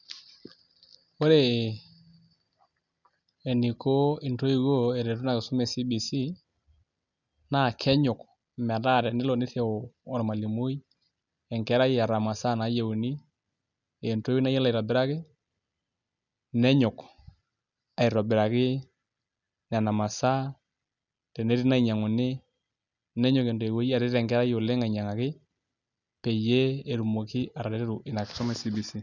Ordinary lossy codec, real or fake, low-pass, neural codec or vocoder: none; real; 7.2 kHz; none